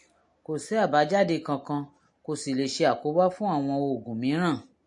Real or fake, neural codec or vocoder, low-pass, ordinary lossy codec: real; none; 10.8 kHz; MP3, 48 kbps